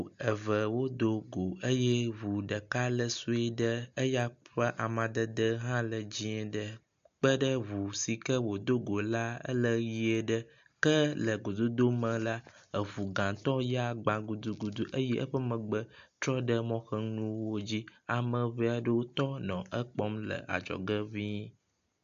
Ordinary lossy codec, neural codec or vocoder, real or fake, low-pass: AAC, 64 kbps; none; real; 7.2 kHz